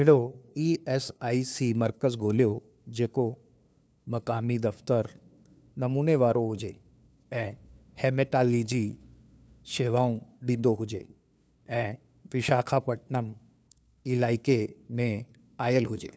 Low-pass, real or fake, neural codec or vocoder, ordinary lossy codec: none; fake; codec, 16 kHz, 2 kbps, FunCodec, trained on LibriTTS, 25 frames a second; none